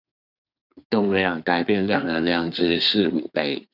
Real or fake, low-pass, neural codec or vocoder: fake; 5.4 kHz; codec, 24 kHz, 1 kbps, SNAC